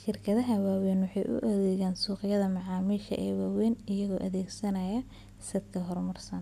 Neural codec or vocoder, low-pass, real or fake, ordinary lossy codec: none; 14.4 kHz; real; none